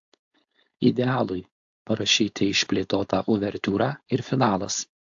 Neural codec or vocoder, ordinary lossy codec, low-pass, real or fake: codec, 16 kHz, 4.8 kbps, FACodec; AAC, 64 kbps; 7.2 kHz; fake